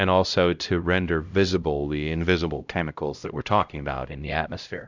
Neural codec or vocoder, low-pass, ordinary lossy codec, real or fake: codec, 16 kHz, 0.5 kbps, X-Codec, HuBERT features, trained on LibriSpeech; 7.2 kHz; Opus, 64 kbps; fake